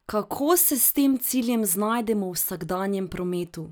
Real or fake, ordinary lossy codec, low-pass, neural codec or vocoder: real; none; none; none